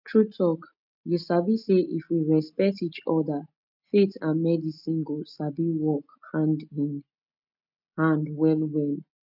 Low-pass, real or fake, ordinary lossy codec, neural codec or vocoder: 5.4 kHz; real; none; none